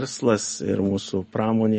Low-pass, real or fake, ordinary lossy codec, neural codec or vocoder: 10.8 kHz; real; MP3, 32 kbps; none